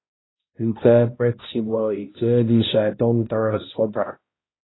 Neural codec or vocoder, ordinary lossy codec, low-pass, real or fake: codec, 16 kHz, 0.5 kbps, X-Codec, HuBERT features, trained on balanced general audio; AAC, 16 kbps; 7.2 kHz; fake